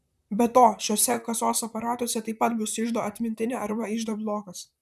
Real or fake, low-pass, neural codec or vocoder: fake; 14.4 kHz; vocoder, 44.1 kHz, 128 mel bands, Pupu-Vocoder